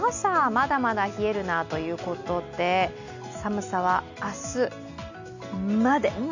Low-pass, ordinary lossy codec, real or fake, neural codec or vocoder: 7.2 kHz; none; real; none